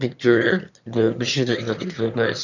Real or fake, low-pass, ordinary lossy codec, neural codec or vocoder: fake; 7.2 kHz; none; autoencoder, 22.05 kHz, a latent of 192 numbers a frame, VITS, trained on one speaker